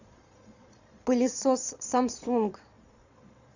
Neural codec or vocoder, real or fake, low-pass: vocoder, 22.05 kHz, 80 mel bands, Vocos; fake; 7.2 kHz